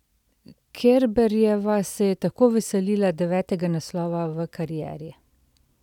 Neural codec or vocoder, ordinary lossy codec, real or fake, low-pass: none; none; real; 19.8 kHz